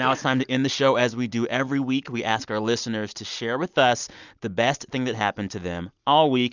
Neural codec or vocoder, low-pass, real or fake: none; 7.2 kHz; real